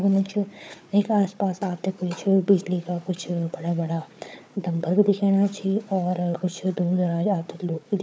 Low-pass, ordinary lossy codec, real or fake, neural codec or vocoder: none; none; fake; codec, 16 kHz, 4 kbps, FunCodec, trained on Chinese and English, 50 frames a second